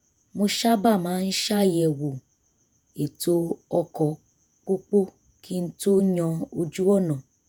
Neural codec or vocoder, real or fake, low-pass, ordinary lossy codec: vocoder, 48 kHz, 128 mel bands, Vocos; fake; none; none